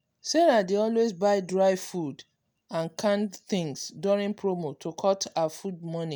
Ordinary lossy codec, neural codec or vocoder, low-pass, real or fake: none; none; none; real